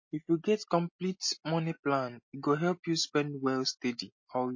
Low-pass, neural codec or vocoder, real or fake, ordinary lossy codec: 7.2 kHz; none; real; MP3, 32 kbps